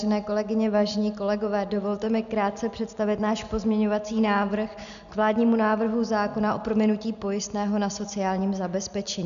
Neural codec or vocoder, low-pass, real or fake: none; 7.2 kHz; real